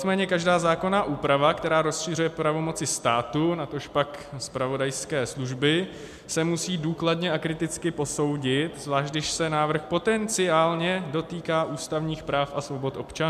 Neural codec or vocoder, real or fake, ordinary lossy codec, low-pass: none; real; MP3, 96 kbps; 14.4 kHz